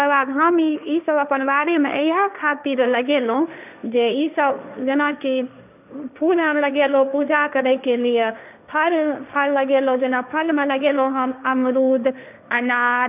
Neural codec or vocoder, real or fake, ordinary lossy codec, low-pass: codec, 16 kHz, 1.1 kbps, Voila-Tokenizer; fake; none; 3.6 kHz